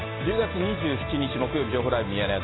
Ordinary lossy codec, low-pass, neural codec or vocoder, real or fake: AAC, 16 kbps; 7.2 kHz; none; real